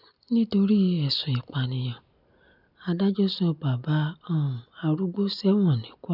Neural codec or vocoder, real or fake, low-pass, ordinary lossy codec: none; real; 5.4 kHz; none